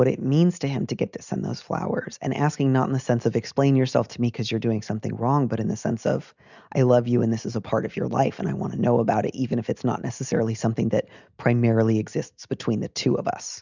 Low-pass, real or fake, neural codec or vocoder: 7.2 kHz; real; none